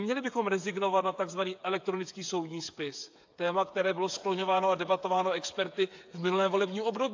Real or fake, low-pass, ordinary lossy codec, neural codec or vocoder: fake; 7.2 kHz; AAC, 48 kbps; codec, 16 kHz, 8 kbps, FreqCodec, smaller model